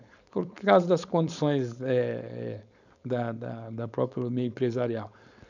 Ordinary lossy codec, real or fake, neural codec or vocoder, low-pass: none; fake; codec, 16 kHz, 4.8 kbps, FACodec; 7.2 kHz